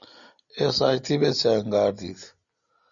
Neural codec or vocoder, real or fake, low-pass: none; real; 7.2 kHz